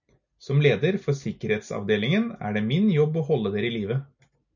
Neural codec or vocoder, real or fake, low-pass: none; real; 7.2 kHz